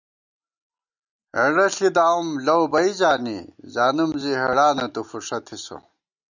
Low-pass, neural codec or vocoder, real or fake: 7.2 kHz; none; real